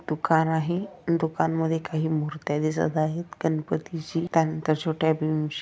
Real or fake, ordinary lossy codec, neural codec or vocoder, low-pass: real; none; none; none